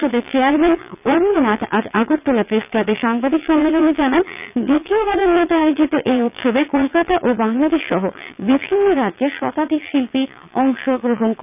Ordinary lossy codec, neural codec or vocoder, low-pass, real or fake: none; vocoder, 22.05 kHz, 80 mel bands, Vocos; 3.6 kHz; fake